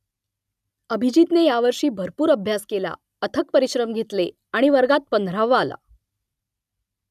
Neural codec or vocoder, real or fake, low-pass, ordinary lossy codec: none; real; 14.4 kHz; none